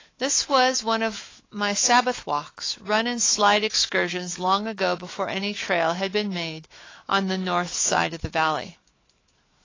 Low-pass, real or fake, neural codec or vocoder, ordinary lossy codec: 7.2 kHz; fake; autoencoder, 48 kHz, 128 numbers a frame, DAC-VAE, trained on Japanese speech; AAC, 32 kbps